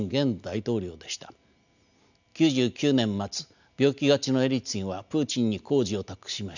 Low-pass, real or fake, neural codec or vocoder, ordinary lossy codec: 7.2 kHz; real; none; none